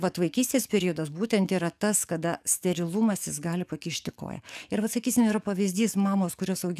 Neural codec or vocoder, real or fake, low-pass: codec, 44.1 kHz, 7.8 kbps, DAC; fake; 14.4 kHz